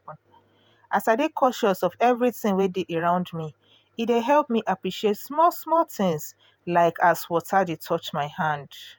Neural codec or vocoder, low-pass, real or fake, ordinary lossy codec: vocoder, 48 kHz, 128 mel bands, Vocos; none; fake; none